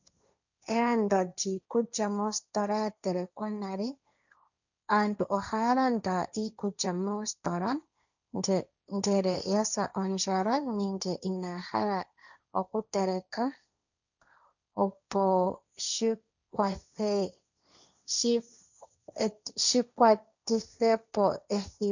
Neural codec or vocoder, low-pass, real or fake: codec, 16 kHz, 1.1 kbps, Voila-Tokenizer; 7.2 kHz; fake